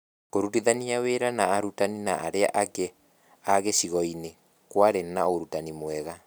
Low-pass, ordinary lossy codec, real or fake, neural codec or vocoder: none; none; real; none